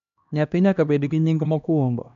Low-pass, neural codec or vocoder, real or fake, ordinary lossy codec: 7.2 kHz; codec, 16 kHz, 1 kbps, X-Codec, HuBERT features, trained on LibriSpeech; fake; none